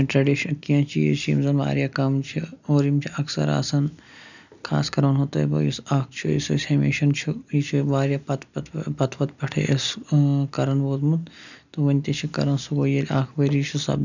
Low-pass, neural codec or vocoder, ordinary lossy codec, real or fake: 7.2 kHz; none; none; real